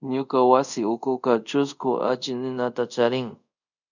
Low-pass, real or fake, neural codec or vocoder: 7.2 kHz; fake; codec, 24 kHz, 0.5 kbps, DualCodec